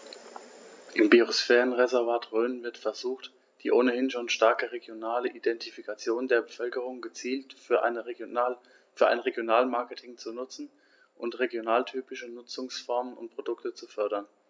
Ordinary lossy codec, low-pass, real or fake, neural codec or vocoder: none; none; real; none